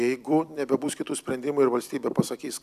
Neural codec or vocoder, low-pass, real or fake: vocoder, 44.1 kHz, 128 mel bands every 256 samples, BigVGAN v2; 14.4 kHz; fake